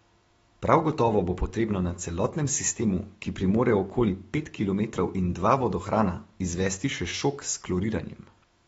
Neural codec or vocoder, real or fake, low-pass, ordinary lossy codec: autoencoder, 48 kHz, 128 numbers a frame, DAC-VAE, trained on Japanese speech; fake; 19.8 kHz; AAC, 24 kbps